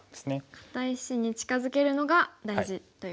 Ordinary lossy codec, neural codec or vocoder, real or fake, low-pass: none; none; real; none